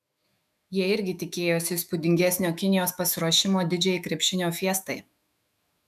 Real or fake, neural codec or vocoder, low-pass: fake; autoencoder, 48 kHz, 128 numbers a frame, DAC-VAE, trained on Japanese speech; 14.4 kHz